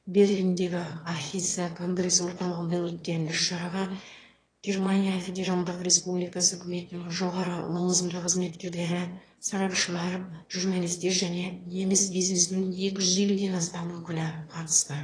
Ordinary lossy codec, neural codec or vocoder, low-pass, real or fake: AAC, 32 kbps; autoencoder, 22.05 kHz, a latent of 192 numbers a frame, VITS, trained on one speaker; 9.9 kHz; fake